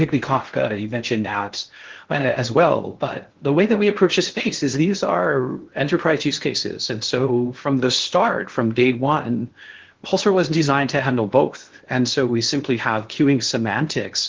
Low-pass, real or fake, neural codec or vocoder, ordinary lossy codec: 7.2 kHz; fake; codec, 16 kHz in and 24 kHz out, 0.6 kbps, FocalCodec, streaming, 4096 codes; Opus, 16 kbps